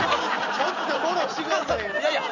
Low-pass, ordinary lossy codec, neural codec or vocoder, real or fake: 7.2 kHz; none; none; real